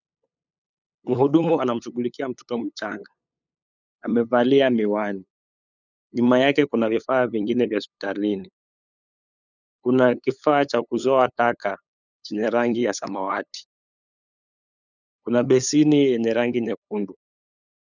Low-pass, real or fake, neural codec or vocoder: 7.2 kHz; fake; codec, 16 kHz, 8 kbps, FunCodec, trained on LibriTTS, 25 frames a second